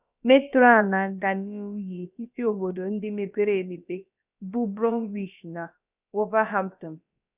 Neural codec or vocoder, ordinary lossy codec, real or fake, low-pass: codec, 16 kHz, about 1 kbps, DyCAST, with the encoder's durations; none; fake; 3.6 kHz